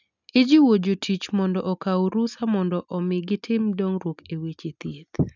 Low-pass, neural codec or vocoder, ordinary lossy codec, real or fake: 7.2 kHz; none; none; real